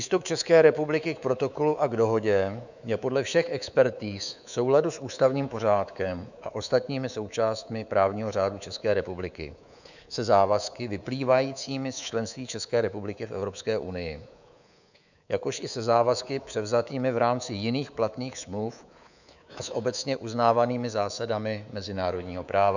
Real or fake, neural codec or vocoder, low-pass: fake; codec, 24 kHz, 3.1 kbps, DualCodec; 7.2 kHz